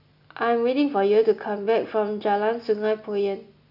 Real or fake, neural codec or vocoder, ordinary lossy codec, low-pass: real; none; none; 5.4 kHz